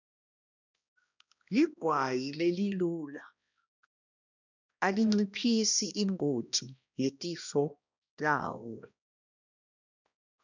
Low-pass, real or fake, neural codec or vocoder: 7.2 kHz; fake; codec, 16 kHz, 1 kbps, X-Codec, HuBERT features, trained on balanced general audio